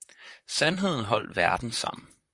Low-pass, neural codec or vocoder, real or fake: 10.8 kHz; vocoder, 44.1 kHz, 128 mel bands, Pupu-Vocoder; fake